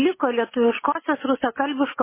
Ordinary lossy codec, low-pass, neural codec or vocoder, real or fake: MP3, 16 kbps; 3.6 kHz; none; real